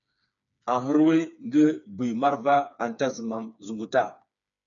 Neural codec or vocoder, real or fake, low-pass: codec, 16 kHz, 4 kbps, FreqCodec, smaller model; fake; 7.2 kHz